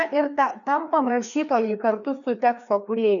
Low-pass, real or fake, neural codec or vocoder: 7.2 kHz; fake; codec, 16 kHz, 2 kbps, FreqCodec, larger model